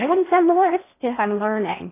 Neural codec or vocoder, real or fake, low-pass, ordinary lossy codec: codec, 16 kHz in and 24 kHz out, 0.6 kbps, FocalCodec, streaming, 4096 codes; fake; 3.6 kHz; AAC, 24 kbps